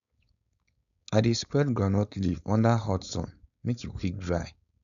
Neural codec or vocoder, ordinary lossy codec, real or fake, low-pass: codec, 16 kHz, 4.8 kbps, FACodec; none; fake; 7.2 kHz